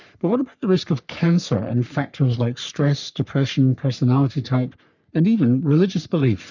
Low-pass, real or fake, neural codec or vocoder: 7.2 kHz; fake; codec, 44.1 kHz, 3.4 kbps, Pupu-Codec